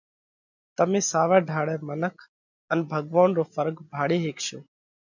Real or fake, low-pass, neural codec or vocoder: real; 7.2 kHz; none